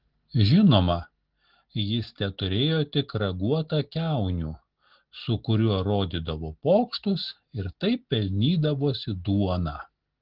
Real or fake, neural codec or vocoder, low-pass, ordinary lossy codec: real; none; 5.4 kHz; Opus, 16 kbps